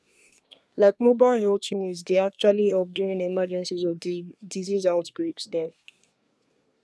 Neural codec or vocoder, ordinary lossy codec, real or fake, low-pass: codec, 24 kHz, 1 kbps, SNAC; none; fake; none